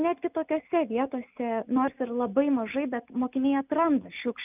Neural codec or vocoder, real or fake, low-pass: none; real; 3.6 kHz